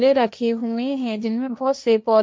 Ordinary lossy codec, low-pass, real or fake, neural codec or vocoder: none; none; fake; codec, 16 kHz, 1.1 kbps, Voila-Tokenizer